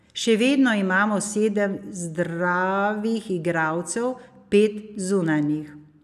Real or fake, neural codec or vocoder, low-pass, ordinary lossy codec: real; none; 14.4 kHz; none